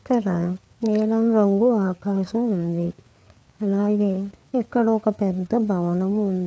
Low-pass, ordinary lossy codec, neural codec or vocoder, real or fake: none; none; codec, 16 kHz, 4 kbps, FreqCodec, larger model; fake